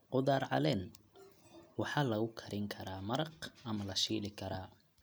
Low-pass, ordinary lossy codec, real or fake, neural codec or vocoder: none; none; real; none